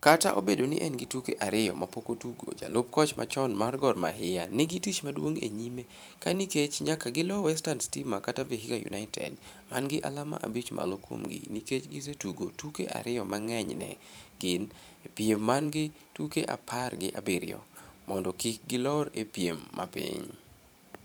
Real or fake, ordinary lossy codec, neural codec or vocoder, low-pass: fake; none; vocoder, 44.1 kHz, 128 mel bands every 512 samples, BigVGAN v2; none